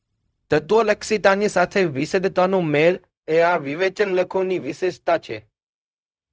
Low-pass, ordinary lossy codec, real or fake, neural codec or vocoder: none; none; fake; codec, 16 kHz, 0.4 kbps, LongCat-Audio-Codec